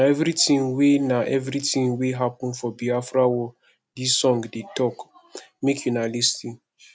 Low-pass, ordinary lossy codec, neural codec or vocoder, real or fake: none; none; none; real